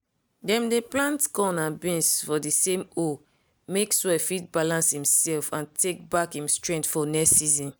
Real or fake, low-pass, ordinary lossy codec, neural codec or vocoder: real; none; none; none